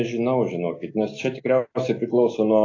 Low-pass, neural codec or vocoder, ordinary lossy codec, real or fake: 7.2 kHz; none; AAC, 32 kbps; real